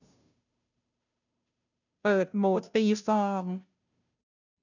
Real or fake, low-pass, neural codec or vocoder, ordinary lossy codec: fake; 7.2 kHz; codec, 16 kHz, 0.5 kbps, FunCodec, trained on Chinese and English, 25 frames a second; none